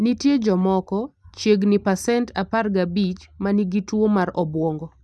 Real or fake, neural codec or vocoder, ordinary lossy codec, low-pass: real; none; none; none